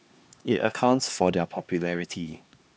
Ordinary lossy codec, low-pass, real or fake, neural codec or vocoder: none; none; fake; codec, 16 kHz, 2 kbps, X-Codec, HuBERT features, trained on LibriSpeech